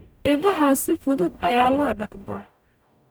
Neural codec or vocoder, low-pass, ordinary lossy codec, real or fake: codec, 44.1 kHz, 0.9 kbps, DAC; none; none; fake